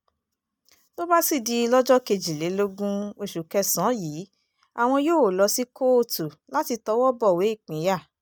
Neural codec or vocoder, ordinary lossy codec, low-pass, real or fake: none; none; none; real